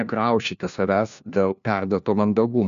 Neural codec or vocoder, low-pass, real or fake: codec, 16 kHz, 1 kbps, FunCodec, trained on LibriTTS, 50 frames a second; 7.2 kHz; fake